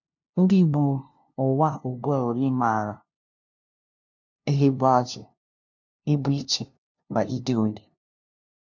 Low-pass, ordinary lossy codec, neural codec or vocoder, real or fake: 7.2 kHz; none; codec, 16 kHz, 0.5 kbps, FunCodec, trained on LibriTTS, 25 frames a second; fake